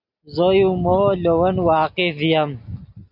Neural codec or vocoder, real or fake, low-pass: none; real; 5.4 kHz